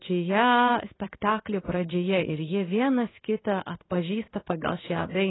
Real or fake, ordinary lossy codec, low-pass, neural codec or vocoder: fake; AAC, 16 kbps; 7.2 kHz; codec, 16 kHz in and 24 kHz out, 1 kbps, XY-Tokenizer